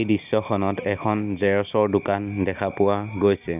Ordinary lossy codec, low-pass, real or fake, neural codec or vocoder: none; 3.6 kHz; real; none